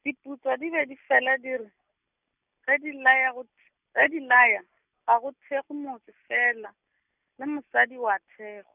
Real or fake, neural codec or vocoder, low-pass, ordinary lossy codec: real; none; 3.6 kHz; none